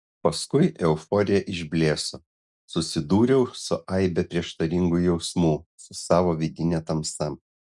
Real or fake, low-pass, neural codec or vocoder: real; 10.8 kHz; none